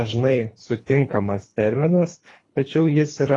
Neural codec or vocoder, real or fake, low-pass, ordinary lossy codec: codec, 24 kHz, 3 kbps, HILCodec; fake; 10.8 kHz; AAC, 32 kbps